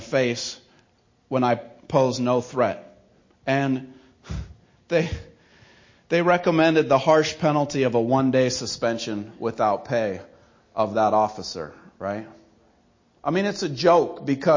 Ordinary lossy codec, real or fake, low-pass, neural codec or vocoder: MP3, 32 kbps; real; 7.2 kHz; none